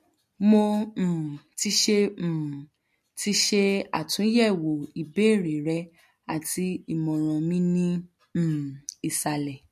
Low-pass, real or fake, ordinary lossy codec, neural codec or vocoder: 14.4 kHz; real; MP3, 64 kbps; none